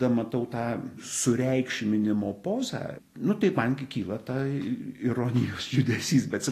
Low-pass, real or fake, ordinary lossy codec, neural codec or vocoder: 14.4 kHz; real; AAC, 48 kbps; none